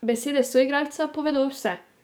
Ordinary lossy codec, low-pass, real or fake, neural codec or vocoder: none; none; fake; codec, 44.1 kHz, 7.8 kbps, DAC